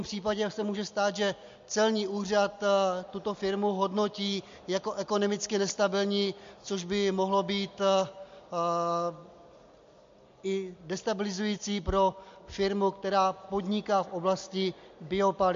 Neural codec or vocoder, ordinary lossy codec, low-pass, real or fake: none; MP3, 48 kbps; 7.2 kHz; real